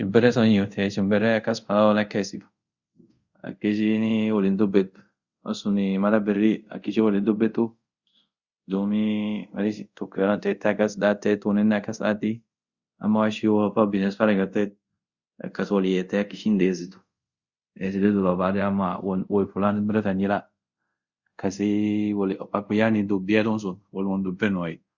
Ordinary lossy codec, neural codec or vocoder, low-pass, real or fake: Opus, 64 kbps; codec, 24 kHz, 0.5 kbps, DualCodec; 7.2 kHz; fake